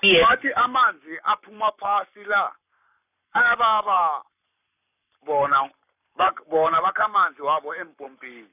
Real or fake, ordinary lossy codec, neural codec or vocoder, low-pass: fake; none; codec, 44.1 kHz, 7.8 kbps, Pupu-Codec; 3.6 kHz